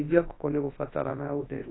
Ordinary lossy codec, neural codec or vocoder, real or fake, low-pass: AAC, 16 kbps; codec, 24 kHz, 0.9 kbps, WavTokenizer, large speech release; fake; 7.2 kHz